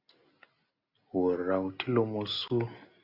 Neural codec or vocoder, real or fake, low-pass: none; real; 5.4 kHz